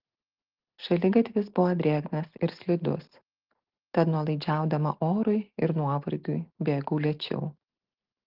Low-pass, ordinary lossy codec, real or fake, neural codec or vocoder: 5.4 kHz; Opus, 16 kbps; real; none